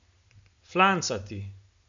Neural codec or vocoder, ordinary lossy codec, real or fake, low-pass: none; AAC, 64 kbps; real; 7.2 kHz